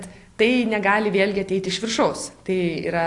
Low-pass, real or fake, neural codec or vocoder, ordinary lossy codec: 10.8 kHz; real; none; AAC, 48 kbps